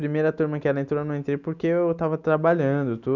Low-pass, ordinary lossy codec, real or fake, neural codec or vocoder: 7.2 kHz; none; real; none